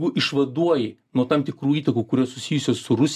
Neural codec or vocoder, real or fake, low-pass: none; real; 14.4 kHz